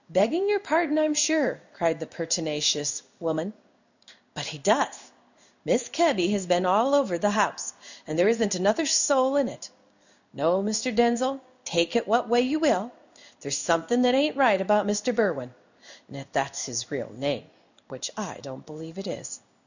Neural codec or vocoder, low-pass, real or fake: codec, 16 kHz in and 24 kHz out, 1 kbps, XY-Tokenizer; 7.2 kHz; fake